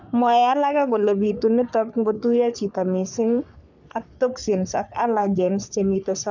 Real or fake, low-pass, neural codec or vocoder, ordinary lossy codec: fake; 7.2 kHz; codec, 44.1 kHz, 3.4 kbps, Pupu-Codec; none